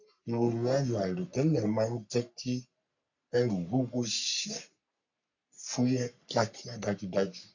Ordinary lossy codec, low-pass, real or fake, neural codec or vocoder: none; 7.2 kHz; fake; codec, 44.1 kHz, 3.4 kbps, Pupu-Codec